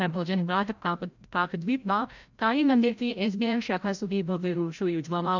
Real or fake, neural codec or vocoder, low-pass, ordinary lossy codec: fake; codec, 16 kHz, 0.5 kbps, FreqCodec, larger model; 7.2 kHz; none